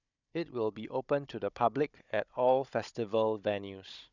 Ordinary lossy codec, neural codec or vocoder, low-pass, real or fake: none; codec, 16 kHz, 16 kbps, FunCodec, trained on Chinese and English, 50 frames a second; 7.2 kHz; fake